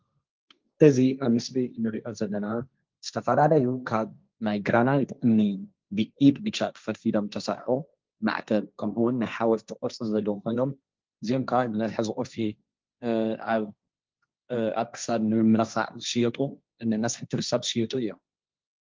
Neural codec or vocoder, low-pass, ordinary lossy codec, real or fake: codec, 16 kHz, 1.1 kbps, Voila-Tokenizer; 7.2 kHz; Opus, 24 kbps; fake